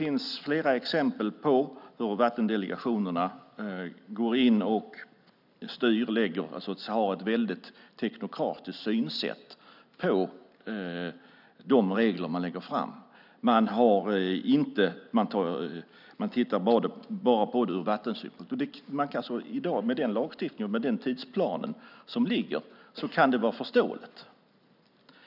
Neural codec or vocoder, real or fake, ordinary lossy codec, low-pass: none; real; none; 5.4 kHz